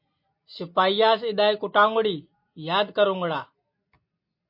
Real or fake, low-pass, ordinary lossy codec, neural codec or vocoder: real; 5.4 kHz; MP3, 32 kbps; none